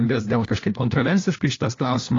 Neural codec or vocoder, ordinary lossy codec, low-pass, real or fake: codec, 16 kHz, 1 kbps, FunCodec, trained on LibriTTS, 50 frames a second; AAC, 32 kbps; 7.2 kHz; fake